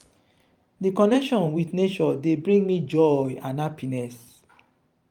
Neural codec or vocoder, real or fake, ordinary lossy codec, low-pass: vocoder, 44.1 kHz, 128 mel bands every 512 samples, BigVGAN v2; fake; Opus, 32 kbps; 19.8 kHz